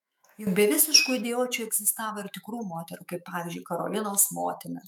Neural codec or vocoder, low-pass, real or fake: autoencoder, 48 kHz, 128 numbers a frame, DAC-VAE, trained on Japanese speech; 19.8 kHz; fake